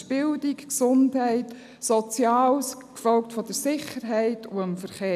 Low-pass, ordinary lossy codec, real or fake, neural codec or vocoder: 14.4 kHz; none; real; none